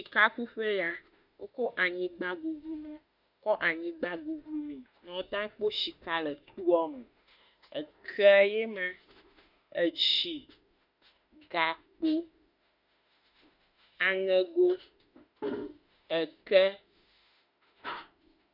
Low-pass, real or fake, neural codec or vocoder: 5.4 kHz; fake; autoencoder, 48 kHz, 32 numbers a frame, DAC-VAE, trained on Japanese speech